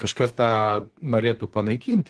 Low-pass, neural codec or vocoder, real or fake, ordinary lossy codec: 10.8 kHz; codec, 24 kHz, 1 kbps, SNAC; fake; Opus, 16 kbps